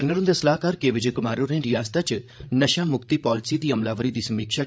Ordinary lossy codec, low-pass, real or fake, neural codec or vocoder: none; none; fake; codec, 16 kHz, 8 kbps, FreqCodec, larger model